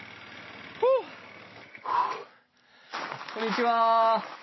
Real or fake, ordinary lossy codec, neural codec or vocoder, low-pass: real; MP3, 24 kbps; none; 7.2 kHz